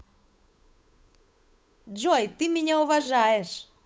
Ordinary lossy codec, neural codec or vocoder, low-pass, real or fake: none; codec, 16 kHz, 8 kbps, FunCodec, trained on Chinese and English, 25 frames a second; none; fake